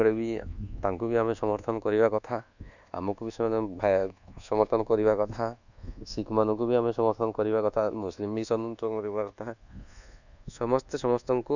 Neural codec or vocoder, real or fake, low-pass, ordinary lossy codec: codec, 24 kHz, 1.2 kbps, DualCodec; fake; 7.2 kHz; none